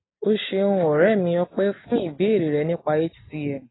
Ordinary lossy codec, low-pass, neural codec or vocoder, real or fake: AAC, 16 kbps; 7.2 kHz; none; real